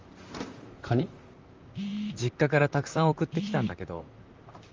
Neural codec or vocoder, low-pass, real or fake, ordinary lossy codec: vocoder, 44.1 kHz, 128 mel bands, Pupu-Vocoder; 7.2 kHz; fake; Opus, 32 kbps